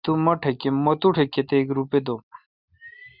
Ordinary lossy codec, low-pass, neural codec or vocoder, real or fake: Opus, 64 kbps; 5.4 kHz; none; real